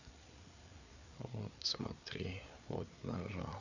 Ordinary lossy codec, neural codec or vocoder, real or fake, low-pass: none; codec, 16 kHz, 4 kbps, FreqCodec, larger model; fake; 7.2 kHz